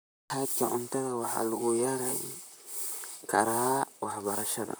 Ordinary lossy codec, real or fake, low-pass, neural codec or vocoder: none; fake; none; vocoder, 44.1 kHz, 128 mel bands, Pupu-Vocoder